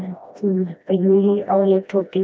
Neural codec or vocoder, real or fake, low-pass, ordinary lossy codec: codec, 16 kHz, 1 kbps, FreqCodec, smaller model; fake; none; none